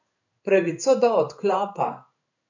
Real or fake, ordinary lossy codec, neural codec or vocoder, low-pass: fake; none; codec, 16 kHz in and 24 kHz out, 1 kbps, XY-Tokenizer; 7.2 kHz